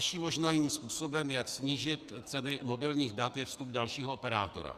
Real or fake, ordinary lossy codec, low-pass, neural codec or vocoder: fake; Opus, 64 kbps; 14.4 kHz; codec, 44.1 kHz, 2.6 kbps, SNAC